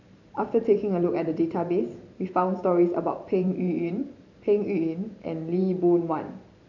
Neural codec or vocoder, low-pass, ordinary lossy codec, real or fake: vocoder, 44.1 kHz, 128 mel bands every 512 samples, BigVGAN v2; 7.2 kHz; none; fake